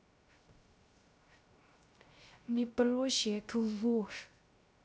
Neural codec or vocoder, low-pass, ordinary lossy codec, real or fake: codec, 16 kHz, 0.3 kbps, FocalCodec; none; none; fake